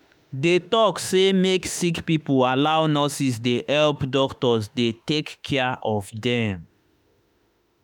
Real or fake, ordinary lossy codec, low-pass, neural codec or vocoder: fake; none; 19.8 kHz; autoencoder, 48 kHz, 32 numbers a frame, DAC-VAE, trained on Japanese speech